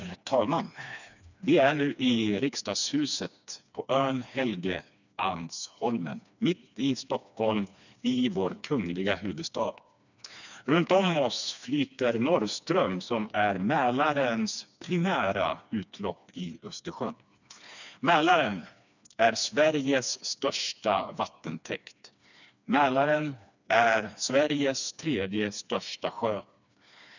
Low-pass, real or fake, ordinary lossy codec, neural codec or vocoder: 7.2 kHz; fake; none; codec, 16 kHz, 2 kbps, FreqCodec, smaller model